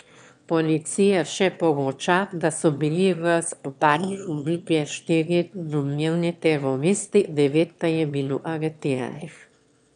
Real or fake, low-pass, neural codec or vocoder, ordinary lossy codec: fake; 9.9 kHz; autoencoder, 22.05 kHz, a latent of 192 numbers a frame, VITS, trained on one speaker; none